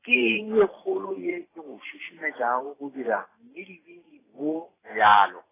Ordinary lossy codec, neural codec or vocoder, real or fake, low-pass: AAC, 16 kbps; vocoder, 44.1 kHz, 128 mel bands every 512 samples, BigVGAN v2; fake; 3.6 kHz